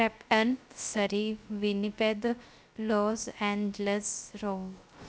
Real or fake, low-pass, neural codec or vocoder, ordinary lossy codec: fake; none; codec, 16 kHz, 0.3 kbps, FocalCodec; none